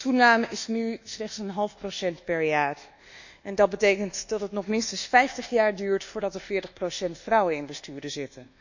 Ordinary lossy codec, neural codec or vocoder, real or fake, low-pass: none; codec, 24 kHz, 1.2 kbps, DualCodec; fake; 7.2 kHz